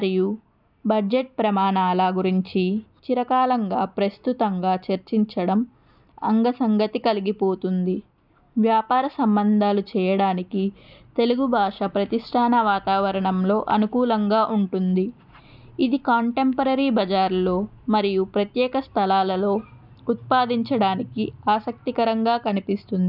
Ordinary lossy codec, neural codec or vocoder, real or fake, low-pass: none; none; real; 5.4 kHz